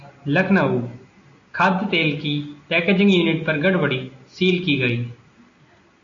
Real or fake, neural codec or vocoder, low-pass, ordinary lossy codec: real; none; 7.2 kHz; AAC, 48 kbps